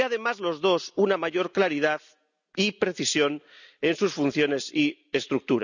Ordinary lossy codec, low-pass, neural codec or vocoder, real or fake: none; 7.2 kHz; none; real